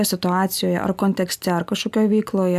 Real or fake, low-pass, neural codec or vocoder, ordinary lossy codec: real; 14.4 kHz; none; AAC, 96 kbps